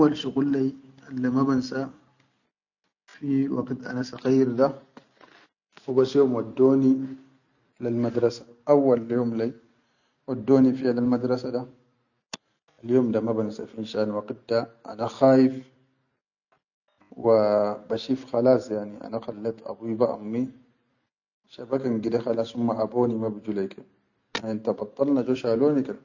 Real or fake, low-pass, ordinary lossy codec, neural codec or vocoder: real; 7.2 kHz; none; none